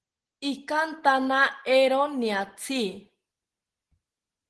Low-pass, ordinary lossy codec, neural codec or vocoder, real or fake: 10.8 kHz; Opus, 16 kbps; none; real